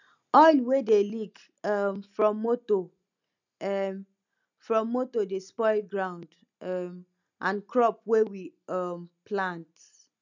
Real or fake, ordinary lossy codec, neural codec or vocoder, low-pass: real; none; none; 7.2 kHz